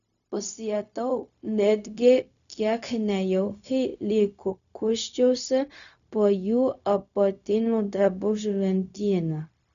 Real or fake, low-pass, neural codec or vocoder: fake; 7.2 kHz; codec, 16 kHz, 0.4 kbps, LongCat-Audio-Codec